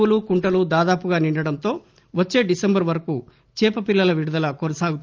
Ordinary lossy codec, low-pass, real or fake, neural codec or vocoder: Opus, 24 kbps; 7.2 kHz; real; none